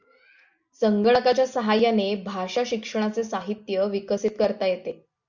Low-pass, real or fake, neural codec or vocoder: 7.2 kHz; real; none